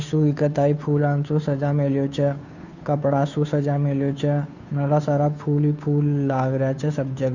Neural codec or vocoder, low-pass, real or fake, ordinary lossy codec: codec, 16 kHz, 2 kbps, FunCodec, trained on Chinese and English, 25 frames a second; 7.2 kHz; fake; none